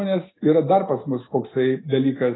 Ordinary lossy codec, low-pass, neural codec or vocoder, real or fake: AAC, 16 kbps; 7.2 kHz; none; real